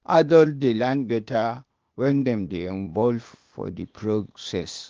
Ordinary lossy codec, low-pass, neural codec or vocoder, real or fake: Opus, 24 kbps; 7.2 kHz; codec, 16 kHz, 0.8 kbps, ZipCodec; fake